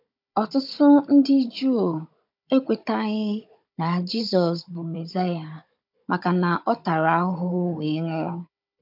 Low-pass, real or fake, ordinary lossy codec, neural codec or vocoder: 5.4 kHz; fake; MP3, 48 kbps; codec, 16 kHz, 16 kbps, FunCodec, trained on Chinese and English, 50 frames a second